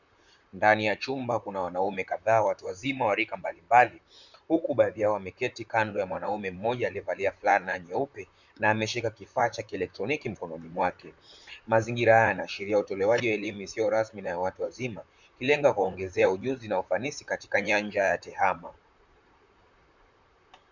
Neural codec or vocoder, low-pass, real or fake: vocoder, 44.1 kHz, 128 mel bands, Pupu-Vocoder; 7.2 kHz; fake